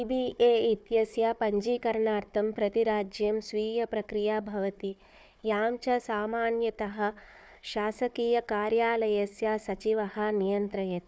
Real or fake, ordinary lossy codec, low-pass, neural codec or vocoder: fake; none; none; codec, 16 kHz, 4 kbps, FunCodec, trained on Chinese and English, 50 frames a second